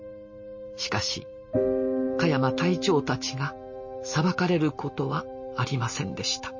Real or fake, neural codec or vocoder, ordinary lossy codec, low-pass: real; none; MP3, 32 kbps; 7.2 kHz